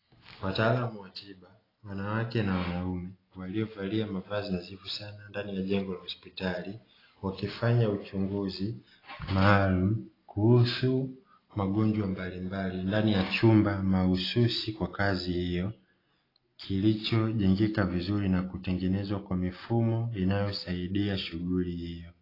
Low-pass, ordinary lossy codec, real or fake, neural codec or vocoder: 5.4 kHz; AAC, 24 kbps; real; none